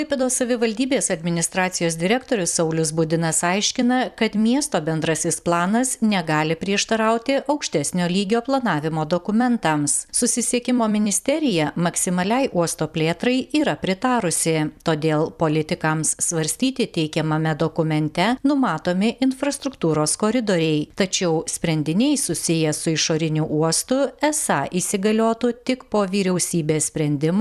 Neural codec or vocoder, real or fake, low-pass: vocoder, 44.1 kHz, 128 mel bands every 256 samples, BigVGAN v2; fake; 14.4 kHz